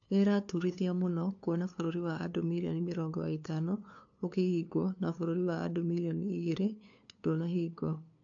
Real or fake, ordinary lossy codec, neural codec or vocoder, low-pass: fake; AAC, 48 kbps; codec, 16 kHz, 2 kbps, FunCodec, trained on LibriTTS, 25 frames a second; 7.2 kHz